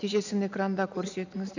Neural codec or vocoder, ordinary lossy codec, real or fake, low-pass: none; none; real; 7.2 kHz